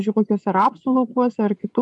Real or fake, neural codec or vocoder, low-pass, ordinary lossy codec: fake; vocoder, 24 kHz, 100 mel bands, Vocos; 10.8 kHz; MP3, 64 kbps